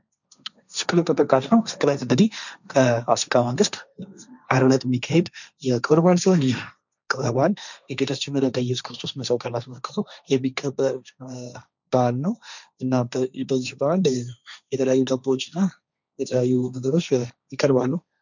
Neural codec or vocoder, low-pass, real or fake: codec, 16 kHz, 1.1 kbps, Voila-Tokenizer; 7.2 kHz; fake